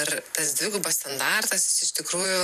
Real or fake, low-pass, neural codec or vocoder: fake; 14.4 kHz; vocoder, 44.1 kHz, 128 mel bands every 512 samples, BigVGAN v2